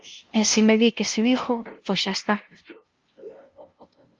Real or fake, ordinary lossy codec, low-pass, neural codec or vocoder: fake; Opus, 24 kbps; 7.2 kHz; codec, 16 kHz, 0.5 kbps, FunCodec, trained on LibriTTS, 25 frames a second